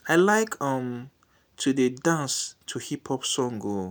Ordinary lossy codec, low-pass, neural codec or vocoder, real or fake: none; none; none; real